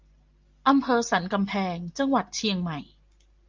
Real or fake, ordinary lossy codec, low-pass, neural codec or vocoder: real; Opus, 32 kbps; 7.2 kHz; none